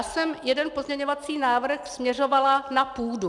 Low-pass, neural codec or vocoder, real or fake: 10.8 kHz; none; real